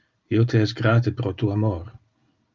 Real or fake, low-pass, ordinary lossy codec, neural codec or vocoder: real; 7.2 kHz; Opus, 24 kbps; none